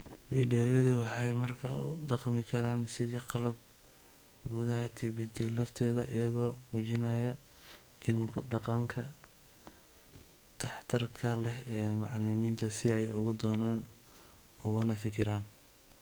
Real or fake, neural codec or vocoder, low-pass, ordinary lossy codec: fake; codec, 44.1 kHz, 2.6 kbps, SNAC; none; none